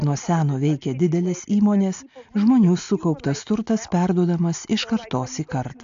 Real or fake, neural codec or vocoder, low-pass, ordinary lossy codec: real; none; 7.2 kHz; AAC, 64 kbps